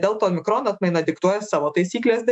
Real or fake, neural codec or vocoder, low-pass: fake; autoencoder, 48 kHz, 128 numbers a frame, DAC-VAE, trained on Japanese speech; 10.8 kHz